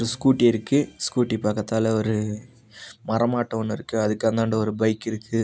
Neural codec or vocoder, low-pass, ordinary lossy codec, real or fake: none; none; none; real